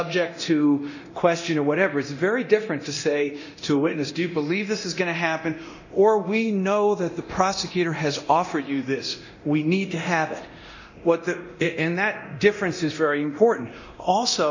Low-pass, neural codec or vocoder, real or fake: 7.2 kHz; codec, 24 kHz, 0.9 kbps, DualCodec; fake